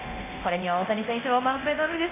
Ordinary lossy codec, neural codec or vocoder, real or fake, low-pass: AAC, 24 kbps; codec, 24 kHz, 0.9 kbps, DualCodec; fake; 3.6 kHz